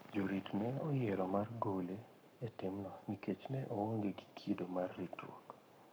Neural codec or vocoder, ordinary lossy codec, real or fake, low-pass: codec, 44.1 kHz, 7.8 kbps, Pupu-Codec; none; fake; none